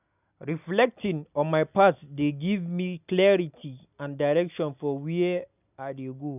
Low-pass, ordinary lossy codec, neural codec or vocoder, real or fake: 3.6 kHz; none; none; real